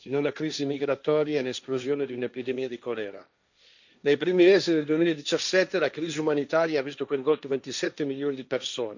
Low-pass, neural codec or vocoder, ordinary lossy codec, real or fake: 7.2 kHz; codec, 16 kHz, 1.1 kbps, Voila-Tokenizer; none; fake